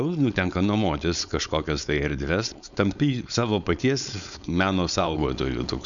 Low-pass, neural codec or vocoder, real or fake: 7.2 kHz; codec, 16 kHz, 4.8 kbps, FACodec; fake